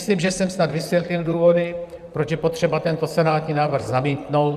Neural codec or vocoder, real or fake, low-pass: vocoder, 44.1 kHz, 128 mel bands, Pupu-Vocoder; fake; 14.4 kHz